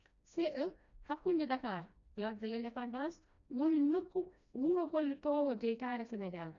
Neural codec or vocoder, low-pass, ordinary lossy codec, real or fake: codec, 16 kHz, 1 kbps, FreqCodec, smaller model; 7.2 kHz; none; fake